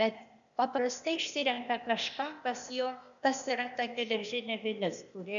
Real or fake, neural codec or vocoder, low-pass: fake; codec, 16 kHz, 0.8 kbps, ZipCodec; 7.2 kHz